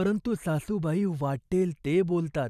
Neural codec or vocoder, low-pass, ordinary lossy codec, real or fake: vocoder, 44.1 kHz, 128 mel bands every 512 samples, BigVGAN v2; 14.4 kHz; none; fake